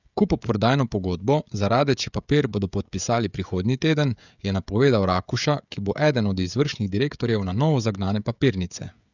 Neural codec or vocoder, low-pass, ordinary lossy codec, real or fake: codec, 16 kHz, 16 kbps, FreqCodec, smaller model; 7.2 kHz; none; fake